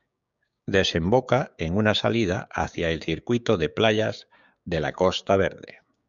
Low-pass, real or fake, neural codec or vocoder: 7.2 kHz; fake; codec, 16 kHz, 6 kbps, DAC